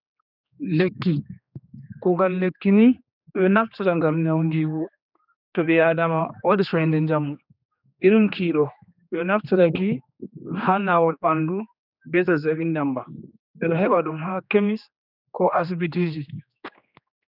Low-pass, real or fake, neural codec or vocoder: 5.4 kHz; fake; codec, 16 kHz, 2 kbps, X-Codec, HuBERT features, trained on general audio